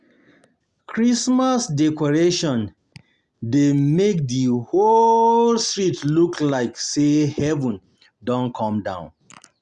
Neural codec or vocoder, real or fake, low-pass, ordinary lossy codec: none; real; 10.8 kHz; Opus, 64 kbps